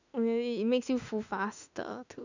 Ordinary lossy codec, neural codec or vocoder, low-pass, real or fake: none; autoencoder, 48 kHz, 32 numbers a frame, DAC-VAE, trained on Japanese speech; 7.2 kHz; fake